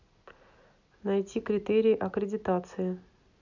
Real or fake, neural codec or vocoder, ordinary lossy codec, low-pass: real; none; none; 7.2 kHz